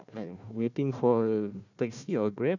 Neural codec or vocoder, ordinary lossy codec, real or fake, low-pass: codec, 16 kHz, 1 kbps, FunCodec, trained on Chinese and English, 50 frames a second; none; fake; 7.2 kHz